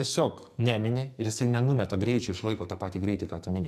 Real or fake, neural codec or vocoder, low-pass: fake; codec, 44.1 kHz, 2.6 kbps, SNAC; 14.4 kHz